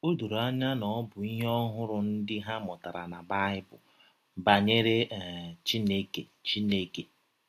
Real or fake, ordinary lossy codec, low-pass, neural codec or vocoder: real; AAC, 64 kbps; 14.4 kHz; none